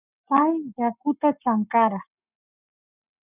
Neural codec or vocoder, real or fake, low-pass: codec, 44.1 kHz, 7.8 kbps, Pupu-Codec; fake; 3.6 kHz